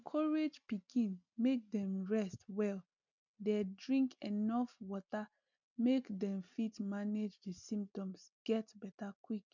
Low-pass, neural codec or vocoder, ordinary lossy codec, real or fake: 7.2 kHz; none; none; real